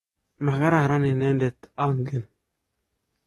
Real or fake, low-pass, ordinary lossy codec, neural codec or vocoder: fake; 19.8 kHz; AAC, 32 kbps; codec, 44.1 kHz, 7.8 kbps, DAC